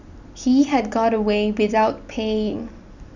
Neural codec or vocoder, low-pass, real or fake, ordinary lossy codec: none; 7.2 kHz; real; none